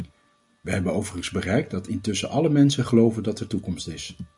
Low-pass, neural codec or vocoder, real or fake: 10.8 kHz; none; real